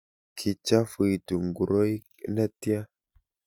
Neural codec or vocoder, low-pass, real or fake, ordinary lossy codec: none; 19.8 kHz; real; none